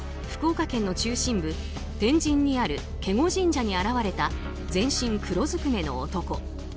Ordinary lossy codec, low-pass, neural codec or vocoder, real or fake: none; none; none; real